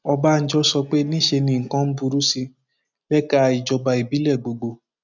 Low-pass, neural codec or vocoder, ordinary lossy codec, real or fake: 7.2 kHz; none; none; real